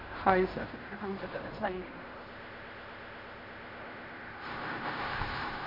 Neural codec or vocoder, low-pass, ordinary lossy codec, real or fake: codec, 16 kHz in and 24 kHz out, 0.4 kbps, LongCat-Audio-Codec, fine tuned four codebook decoder; 5.4 kHz; MP3, 32 kbps; fake